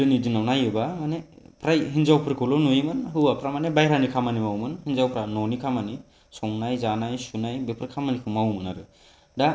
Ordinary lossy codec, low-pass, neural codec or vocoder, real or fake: none; none; none; real